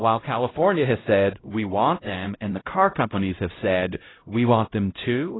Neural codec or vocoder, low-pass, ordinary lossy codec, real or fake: codec, 16 kHz, 0.5 kbps, X-Codec, HuBERT features, trained on LibriSpeech; 7.2 kHz; AAC, 16 kbps; fake